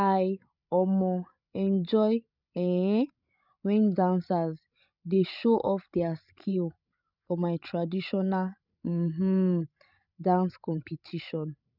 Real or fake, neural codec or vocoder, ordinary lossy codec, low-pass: fake; codec, 16 kHz, 16 kbps, FreqCodec, larger model; none; 5.4 kHz